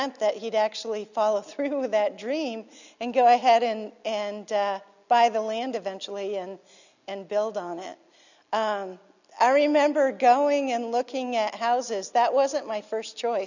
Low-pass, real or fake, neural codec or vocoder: 7.2 kHz; real; none